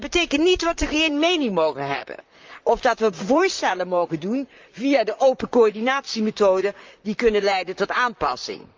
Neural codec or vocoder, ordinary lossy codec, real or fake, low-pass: vocoder, 44.1 kHz, 128 mel bands, Pupu-Vocoder; Opus, 24 kbps; fake; 7.2 kHz